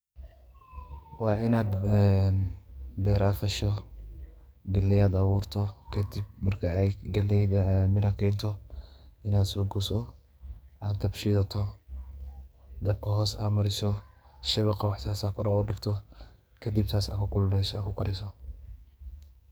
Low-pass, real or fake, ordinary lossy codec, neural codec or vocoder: none; fake; none; codec, 44.1 kHz, 2.6 kbps, SNAC